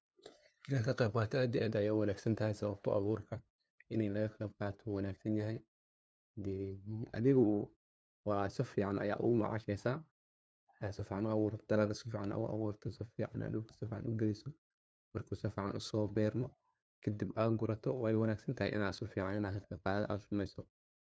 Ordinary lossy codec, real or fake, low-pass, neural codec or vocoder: none; fake; none; codec, 16 kHz, 2 kbps, FunCodec, trained on LibriTTS, 25 frames a second